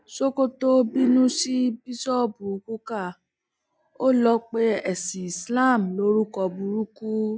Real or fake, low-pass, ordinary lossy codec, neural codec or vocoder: real; none; none; none